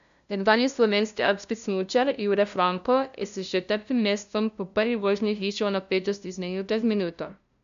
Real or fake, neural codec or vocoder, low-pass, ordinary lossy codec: fake; codec, 16 kHz, 0.5 kbps, FunCodec, trained on LibriTTS, 25 frames a second; 7.2 kHz; AAC, 96 kbps